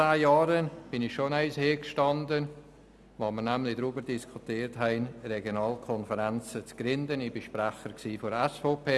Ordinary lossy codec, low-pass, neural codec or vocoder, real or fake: none; none; none; real